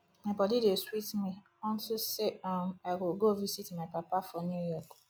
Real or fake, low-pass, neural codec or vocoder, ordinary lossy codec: real; none; none; none